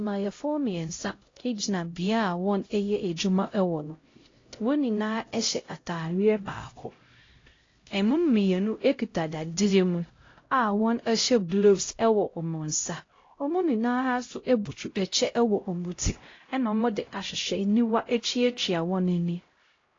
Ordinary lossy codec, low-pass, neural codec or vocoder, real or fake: AAC, 32 kbps; 7.2 kHz; codec, 16 kHz, 0.5 kbps, X-Codec, HuBERT features, trained on LibriSpeech; fake